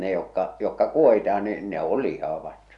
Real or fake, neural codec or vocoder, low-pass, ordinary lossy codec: real; none; 10.8 kHz; Opus, 64 kbps